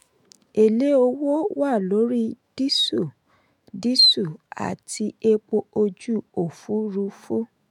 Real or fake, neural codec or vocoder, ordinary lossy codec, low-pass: fake; autoencoder, 48 kHz, 128 numbers a frame, DAC-VAE, trained on Japanese speech; MP3, 96 kbps; 19.8 kHz